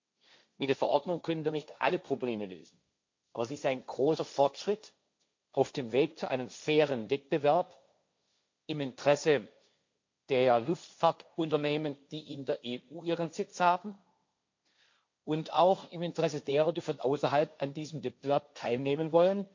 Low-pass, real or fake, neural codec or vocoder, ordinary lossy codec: 7.2 kHz; fake; codec, 16 kHz, 1.1 kbps, Voila-Tokenizer; MP3, 48 kbps